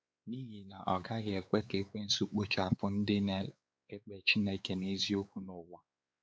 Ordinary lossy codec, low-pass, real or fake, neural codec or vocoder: none; none; fake; codec, 16 kHz, 4 kbps, X-Codec, WavLM features, trained on Multilingual LibriSpeech